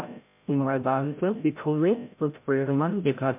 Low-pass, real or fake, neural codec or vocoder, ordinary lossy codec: 3.6 kHz; fake; codec, 16 kHz, 0.5 kbps, FreqCodec, larger model; MP3, 32 kbps